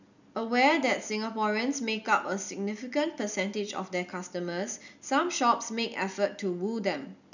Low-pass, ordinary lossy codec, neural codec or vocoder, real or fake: 7.2 kHz; none; none; real